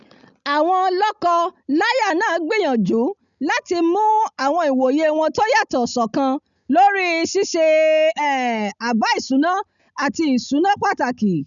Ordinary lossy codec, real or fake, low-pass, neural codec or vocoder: none; real; 7.2 kHz; none